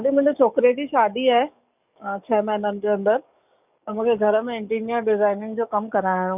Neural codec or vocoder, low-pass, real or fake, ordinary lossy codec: codec, 44.1 kHz, 7.8 kbps, DAC; 3.6 kHz; fake; none